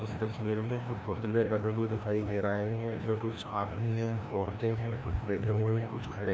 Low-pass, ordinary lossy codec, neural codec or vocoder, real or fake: none; none; codec, 16 kHz, 1 kbps, FreqCodec, larger model; fake